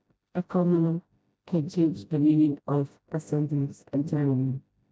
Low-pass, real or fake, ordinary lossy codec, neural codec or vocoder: none; fake; none; codec, 16 kHz, 0.5 kbps, FreqCodec, smaller model